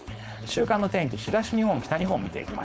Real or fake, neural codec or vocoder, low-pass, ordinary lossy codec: fake; codec, 16 kHz, 4.8 kbps, FACodec; none; none